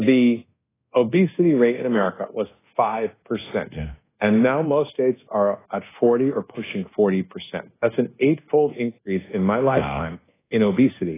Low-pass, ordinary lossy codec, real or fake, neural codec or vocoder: 3.6 kHz; AAC, 16 kbps; fake; codec, 24 kHz, 1.2 kbps, DualCodec